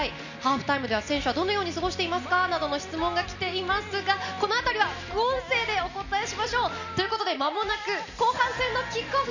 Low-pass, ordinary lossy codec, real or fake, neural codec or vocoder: 7.2 kHz; none; real; none